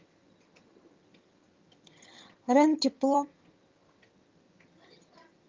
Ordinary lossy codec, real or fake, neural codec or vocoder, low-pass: Opus, 24 kbps; fake; vocoder, 22.05 kHz, 80 mel bands, HiFi-GAN; 7.2 kHz